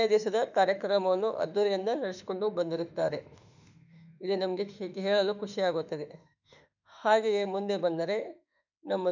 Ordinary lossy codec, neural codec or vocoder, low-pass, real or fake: none; autoencoder, 48 kHz, 32 numbers a frame, DAC-VAE, trained on Japanese speech; 7.2 kHz; fake